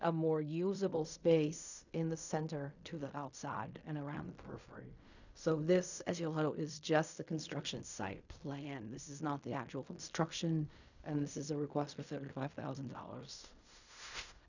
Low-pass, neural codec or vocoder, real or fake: 7.2 kHz; codec, 16 kHz in and 24 kHz out, 0.4 kbps, LongCat-Audio-Codec, fine tuned four codebook decoder; fake